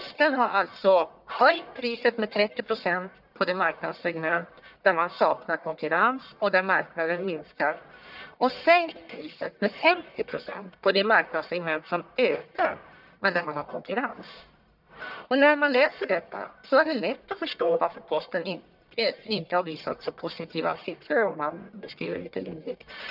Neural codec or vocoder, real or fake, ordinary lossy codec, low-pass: codec, 44.1 kHz, 1.7 kbps, Pupu-Codec; fake; none; 5.4 kHz